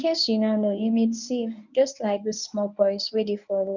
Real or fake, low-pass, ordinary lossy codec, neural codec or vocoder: fake; 7.2 kHz; none; codec, 24 kHz, 0.9 kbps, WavTokenizer, medium speech release version 1